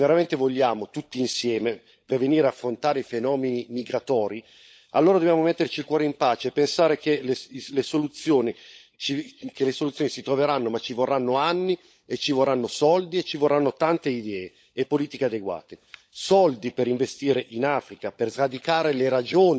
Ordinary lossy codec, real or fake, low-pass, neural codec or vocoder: none; fake; none; codec, 16 kHz, 16 kbps, FunCodec, trained on LibriTTS, 50 frames a second